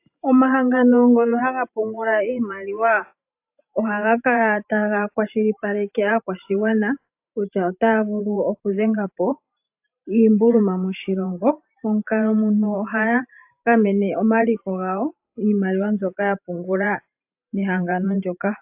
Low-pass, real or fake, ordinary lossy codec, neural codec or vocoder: 3.6 kHz; fake; AAC, 32 kbps; vocoder, 44.1 kHz, 128 mel bands every 512 samples, BigVGAN v2